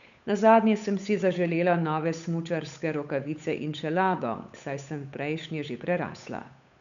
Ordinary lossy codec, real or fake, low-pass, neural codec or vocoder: none; fake; 7.2 kHz; codec, 16 kHz, 8 kbps, FunCodec, trained on Chinese and English, 25 frames a second